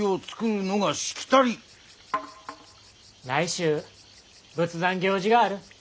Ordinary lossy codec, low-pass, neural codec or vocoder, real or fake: none; none; none; real